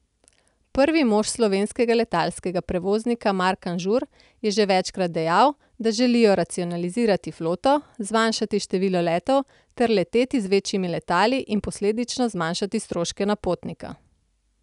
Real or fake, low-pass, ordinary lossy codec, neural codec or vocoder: real; 10.8 kHz; none; none